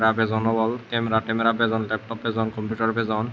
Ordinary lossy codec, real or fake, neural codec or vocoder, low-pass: none; real; none; none